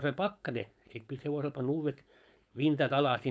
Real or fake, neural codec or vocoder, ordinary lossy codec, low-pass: fake; codec, 16 kHz, 4.8 kbps, FACodec; none; none